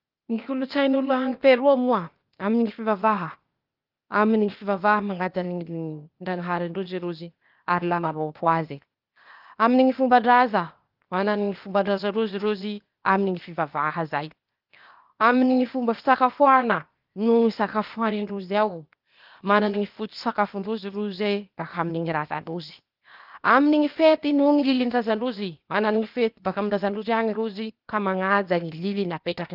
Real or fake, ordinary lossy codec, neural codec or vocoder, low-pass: fake; Opus, 32 kbps; codec, 16 kHz, 0.8 kbps, ZipCodec; 5.4 kHz